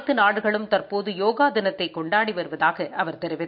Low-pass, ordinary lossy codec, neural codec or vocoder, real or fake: 5.4 kHz; none; none; real